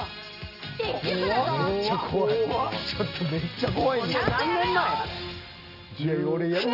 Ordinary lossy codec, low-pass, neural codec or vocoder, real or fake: none; 5.4 kHz; none; real